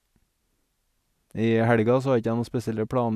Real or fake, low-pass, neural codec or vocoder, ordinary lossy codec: real; 14.4 kHz; none; none